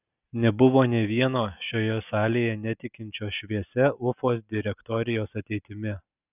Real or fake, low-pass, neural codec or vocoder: real; 3.6 kHz; none